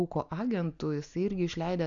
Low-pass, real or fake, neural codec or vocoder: 7.2 kHz; real; none